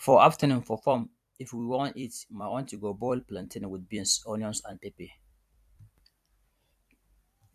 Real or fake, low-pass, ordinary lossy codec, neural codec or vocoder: fake; 14.4 kHz; AAC, 96 kbps; vocoder, 44.1 kHz, 128 mel bands every 256 samples, BigVGAN v2